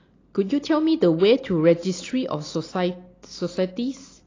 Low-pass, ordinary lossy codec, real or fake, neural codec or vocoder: 7.2 kHz; AAC, 32 kbps; real; none